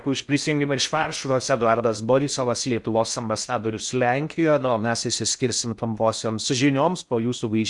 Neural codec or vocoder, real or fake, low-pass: codec, 16 kHz in and 24 kHz out, 0.6 kbps, FocalCodec, streaming, 4096 codes; fake; 10.8 kHz